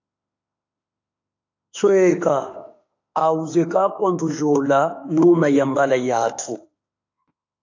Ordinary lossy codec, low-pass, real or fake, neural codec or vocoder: AAC, 48 kbps; 7.2 kHz; fake; autoencoder, 48 kHz, 32 numbers a frame, DAC-VAE, trained on Japanese speech